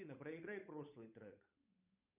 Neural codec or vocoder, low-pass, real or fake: codec, 16 kHz, 8 kbps, FunCodec, trained on Chinese and English, 25 frames a second; 3.6 kHz; fake